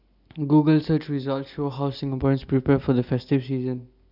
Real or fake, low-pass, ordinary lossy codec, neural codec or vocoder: real; 5.4 kHz; none; none